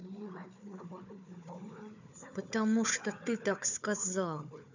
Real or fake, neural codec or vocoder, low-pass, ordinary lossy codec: fake; codec, 16 kHz, 4 kbps, FunCodec, trained on Chinese and English, 50 frames a second; 7.2 kHz; none